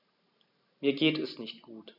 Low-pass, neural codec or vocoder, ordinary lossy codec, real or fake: 5.4 kHz; none; none; real